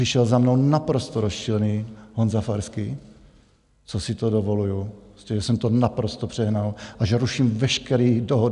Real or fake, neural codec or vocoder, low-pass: real; none; 10.8 kHz